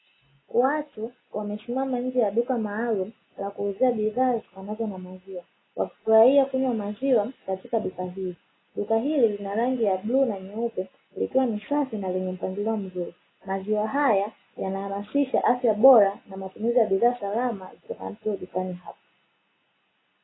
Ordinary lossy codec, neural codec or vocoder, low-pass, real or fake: AAC, 16 kbps; none; 7.2 kHz; real